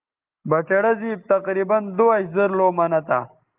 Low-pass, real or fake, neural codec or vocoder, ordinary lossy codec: 3.6 kHz; real; none; Opus, 32 kbps